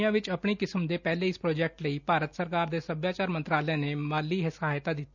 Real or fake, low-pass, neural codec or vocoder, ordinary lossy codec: real; 7.2 kHz; none; none